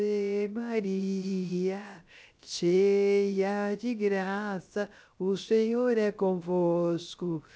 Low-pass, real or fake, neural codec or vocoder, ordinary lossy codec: none; fake; codec, 16 kHz, 0.3 kbps, FocalCodec; none